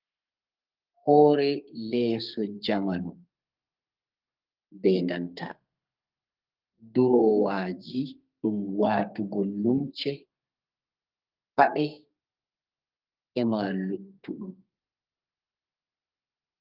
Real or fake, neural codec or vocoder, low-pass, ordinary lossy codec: fake; codec, 32 kHz, 1.9 kbps, SNAC; 5.4 kHz; Opus, 32 kbps